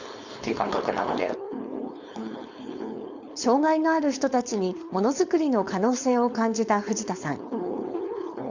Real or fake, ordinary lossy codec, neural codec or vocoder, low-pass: fake; Opus, 64 kbps; codec, 16 kHz, 4.8 kbps, FACodec; 7.2 kHz